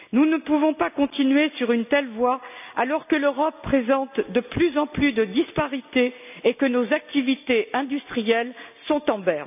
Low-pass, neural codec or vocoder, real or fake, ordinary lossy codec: 3.6 kHz; none; real; none